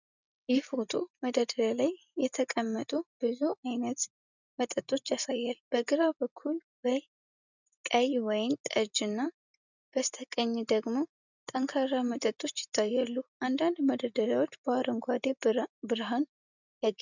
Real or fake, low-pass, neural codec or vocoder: real; 7.2 kHz; none